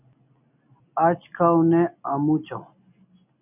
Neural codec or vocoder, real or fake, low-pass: none; real; 3.6 kHz